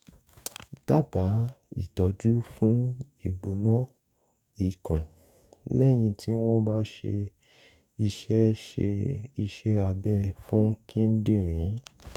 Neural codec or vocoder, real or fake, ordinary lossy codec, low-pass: codec, 44.1 kHz, 2.6 kbps, DAC; fake; none; 19.8 kHz